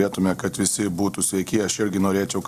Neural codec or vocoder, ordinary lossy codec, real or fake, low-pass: none; Opus, 64 kbps; real; 14.4 kHz